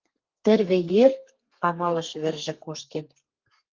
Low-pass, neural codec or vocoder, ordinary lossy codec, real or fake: 7.2 kHz; codec, 32 kHz, 1.9 kbps, SNAC; Opus, 24 kbps; fake